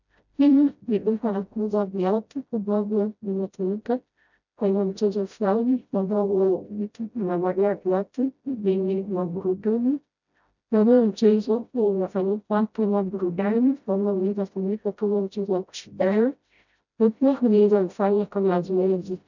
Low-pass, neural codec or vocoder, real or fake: 7.2 kHz; codec, 16 kHz, 0.5 kbps, FreqCodec, smaller model; fake